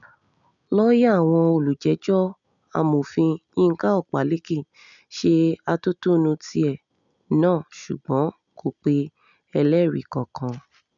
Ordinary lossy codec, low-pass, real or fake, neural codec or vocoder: none; 7.2 kHz; real; none